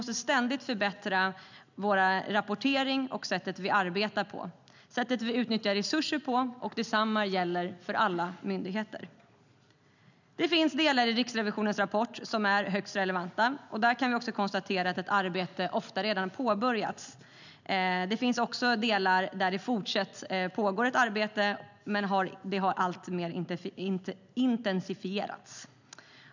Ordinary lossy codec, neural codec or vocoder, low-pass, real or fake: none; none; 7.2 kHz; real